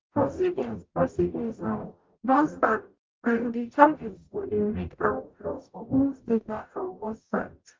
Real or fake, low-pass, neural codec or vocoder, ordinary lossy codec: fake; 7.2 kHz; codec, 44.1 kHz, 0.9 kbps, DAC; Opus, 24 kbps